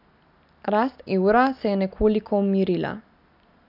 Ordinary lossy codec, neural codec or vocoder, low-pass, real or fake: none; none; 5.4 kHz; real